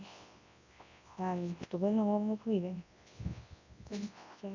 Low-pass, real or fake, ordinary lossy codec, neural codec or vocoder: 7.2 kHz; fake; MP3, 64 kbps; codec, 24 kHz, 0.9 kbps, WavTokenizer, large speech release